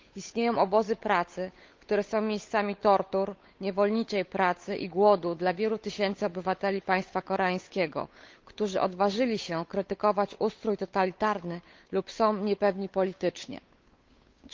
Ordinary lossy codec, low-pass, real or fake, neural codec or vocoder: Opus, 32 kbps; 7.2 kHz; fake; codec, 16 kHz, 8 kbps, FunCodec, trained on Chinese and English, 25 frames a second